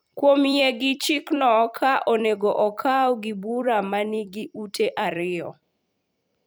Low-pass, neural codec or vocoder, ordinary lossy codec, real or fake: none; vocoder, 44.1 kHz, 128 mel bands every 256 samples, BigVGAN v2; none; fake